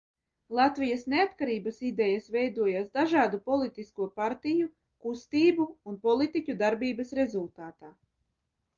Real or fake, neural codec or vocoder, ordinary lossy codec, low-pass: real; none; Opus, 32 kbps; 7.2 kHz